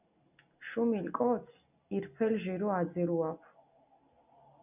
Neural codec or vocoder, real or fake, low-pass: none; real; 3.6 kHz